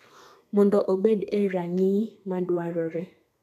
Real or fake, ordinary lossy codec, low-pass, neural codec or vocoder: fake; none; 14.4 kHz; codec, 32 kHz, 1.9 kbps, SNAC